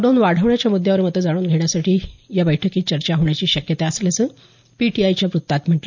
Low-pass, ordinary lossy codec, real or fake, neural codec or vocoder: 7.2 kHz; none; real; none